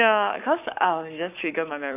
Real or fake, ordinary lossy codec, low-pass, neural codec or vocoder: fake; none; 3.6 kHz; codec, 44.1 kHz, 7.8 kbps, Pupu-Codec